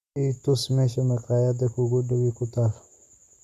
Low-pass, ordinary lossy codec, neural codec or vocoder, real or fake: 14.4 kHz; Opus, 64 kbps; none; real